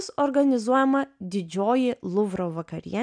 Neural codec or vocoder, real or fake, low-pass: none; real; 9.9 kHz